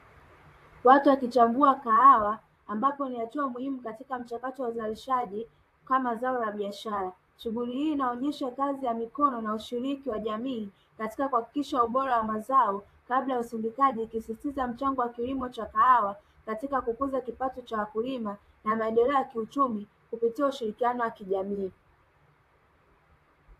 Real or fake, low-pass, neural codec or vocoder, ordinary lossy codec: fake; 14.4 kHz; vocoder, 44.1 kHz, 128 mel bands, Pupu-Vocoder; AAC, 64 kbps